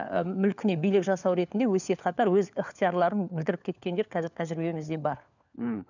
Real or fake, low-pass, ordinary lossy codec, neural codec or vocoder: fake; 7.2 kHz; none; codec, 16 kHz, 4 kbps, FunCodec, trained on LibriTTS, 50 frames a second